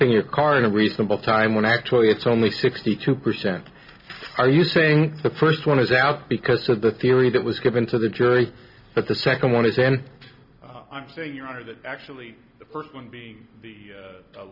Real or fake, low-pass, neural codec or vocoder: real; 5.4 kHz; none